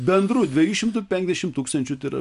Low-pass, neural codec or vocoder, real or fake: 10.8 kHz; none; real